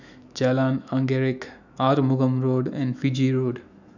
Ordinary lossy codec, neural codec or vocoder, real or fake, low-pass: none; autoencoder, 48 kHz, 128 numbers a frame, DAC-VAE, trained on Japanese speech; fake; 7.2 kHz